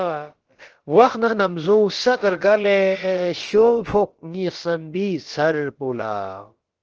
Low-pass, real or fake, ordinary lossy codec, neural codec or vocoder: 7.2 kHz; fake; Opus, 16 kbps; codec, 16 kHz, about 1 kbps, DyCAST, with the encoder's durations